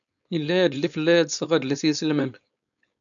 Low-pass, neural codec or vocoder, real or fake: 7.2 kHz; codec, 16 kHz, 4.8 kbps, FACodec; fake